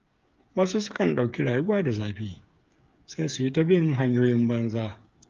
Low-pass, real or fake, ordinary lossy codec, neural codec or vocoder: 7.2 kHz; fake; Opus, 32 kbps; codec, 16 kHz, 8 kbps, FreqCodec, smaller model